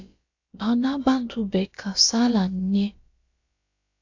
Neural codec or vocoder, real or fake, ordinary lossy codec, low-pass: codec, 16 kHz, about 1 kbps, DyCAST, with the encoder's durations; fake; AAC, 48 kbps; 7.2 kHz